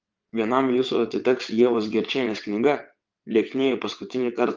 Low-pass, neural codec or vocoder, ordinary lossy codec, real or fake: 7.2 kHz; vocoder, 22.05 kHz, 80 mel bands, WaveNeXt; Opus, 32 kbps; fake